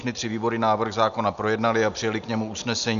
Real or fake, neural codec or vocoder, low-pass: real; none; 7.2 kHz